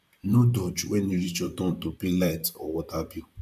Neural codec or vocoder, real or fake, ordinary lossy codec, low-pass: vocoder, 44.1 kHz, 128 mel bands, Pupu-Vocoder; fake; none; 14.4 kHz